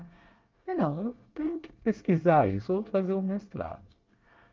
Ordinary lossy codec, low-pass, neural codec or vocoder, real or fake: Opus, 24 kbps; 7.2 kHz; codec, 24 kHz, 1 kbps, SNAC; fake